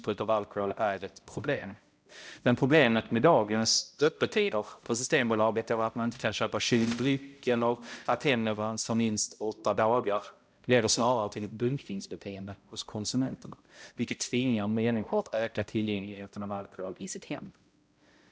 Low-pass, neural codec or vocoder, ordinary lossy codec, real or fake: none; codec, 16 kHz, 0.5 kbps, X-Codec, HuBERT features, trained on balanced general audio; none; fake